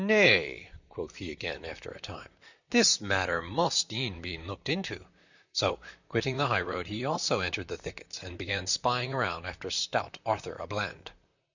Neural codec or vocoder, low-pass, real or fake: vocoder, 44.1 kHz, 128 mel bands, Pupu-Vocoder; 7.2 kHz; fake